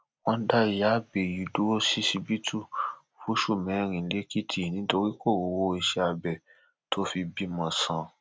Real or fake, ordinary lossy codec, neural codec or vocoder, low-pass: real; none; none; none